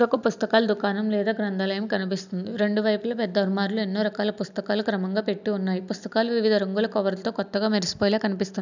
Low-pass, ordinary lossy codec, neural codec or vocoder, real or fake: 7.2 kHz; none; none; real